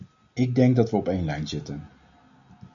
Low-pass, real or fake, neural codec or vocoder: 7.2 kHz; real; none